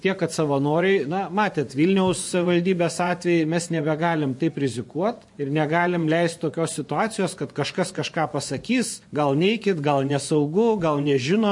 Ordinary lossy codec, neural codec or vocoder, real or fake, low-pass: MP3, 48 kbps; vocoder, 24 kHz, 100 mel bands, Vocos; fake; 10.8 kHz